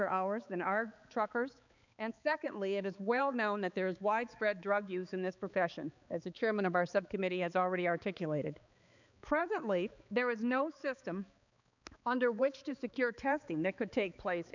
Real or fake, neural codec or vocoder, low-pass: fake; codec, 16 kHz, 4 kbps, X-Codec, HuBERT features, trained on balanced general audio; 7.2 kHz